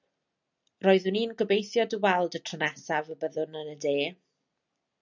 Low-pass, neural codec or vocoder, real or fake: 7.2 kHz; none; real